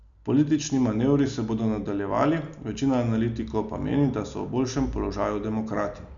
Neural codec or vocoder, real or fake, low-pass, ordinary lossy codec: none; real; 7.2 kHz; none